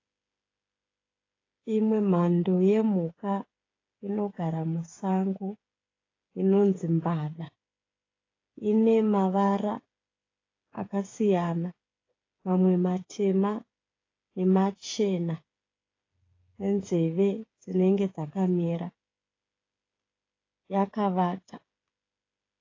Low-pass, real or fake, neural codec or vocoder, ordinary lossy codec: 7.2 kHz; fake; codec, 16 kHz, 8 kbps, FreqCodec, smaller model; AAC, 32 kbps